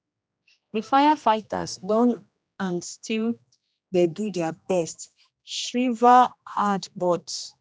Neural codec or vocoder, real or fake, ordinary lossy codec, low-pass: codec, 16 kHz, 1 kbps, X-Codec, HuBERT features, trained on general audio; fake; none; none